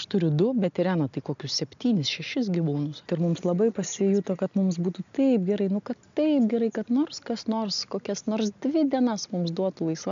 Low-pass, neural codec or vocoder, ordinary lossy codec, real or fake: 7.2 kHz; none; MP3, 64 kbps; real